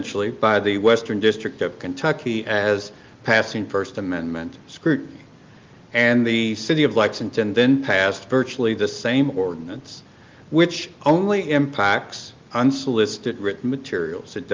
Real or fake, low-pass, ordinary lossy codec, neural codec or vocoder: real; 7.2 kHz; Opus, 32 kbps; none